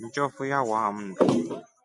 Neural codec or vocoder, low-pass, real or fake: none; 10.8 kHz; real